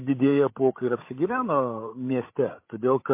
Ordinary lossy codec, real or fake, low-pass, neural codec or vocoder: MP3, 24 kbps; fake; 3.6 kHz; codec, 16 kHz, 16 kbps, FreqCodec, larger model